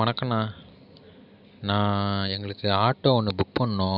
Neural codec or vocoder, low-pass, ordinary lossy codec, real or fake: none; 5.4 kHz; none; real